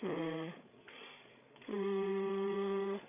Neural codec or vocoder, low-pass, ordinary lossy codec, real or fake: codec, 16 kHz, 8 kbps, FreqCodec, larger model; 3.6 kHz; none; fake